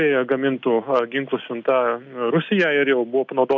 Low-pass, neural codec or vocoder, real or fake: 7.2 kHz; none; real